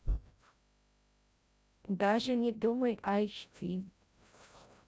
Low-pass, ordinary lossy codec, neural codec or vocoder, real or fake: none; none; codec, 16 kHz, 0.5 kbps, FreqCodec, larger model; fake